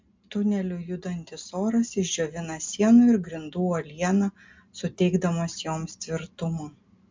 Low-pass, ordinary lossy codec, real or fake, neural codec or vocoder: 7.2 kHz; MP3, 64 kbps; real; none